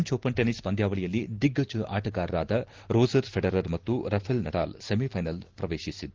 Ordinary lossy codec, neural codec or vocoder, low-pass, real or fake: Opus, 16 kbps; none; 7.2 kHz; real